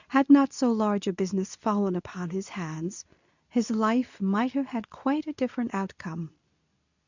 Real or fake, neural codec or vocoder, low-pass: fake; codec, 24 kHz, 0.9 kbps, WavTokenizer, medium speech release version 2; 7.2 kHz